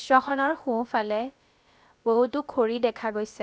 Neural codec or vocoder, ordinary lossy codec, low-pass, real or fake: codec, 16 kHz, about 1 kbps, DyCAST, with the encoder's durations; none; none; fake